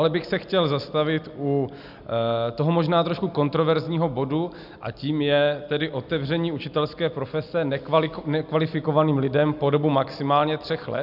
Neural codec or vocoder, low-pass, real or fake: none; 5.4 kHz; real